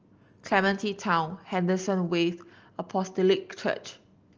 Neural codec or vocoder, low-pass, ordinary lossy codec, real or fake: none; 7.2 kHz; Opus, 24 kbps; real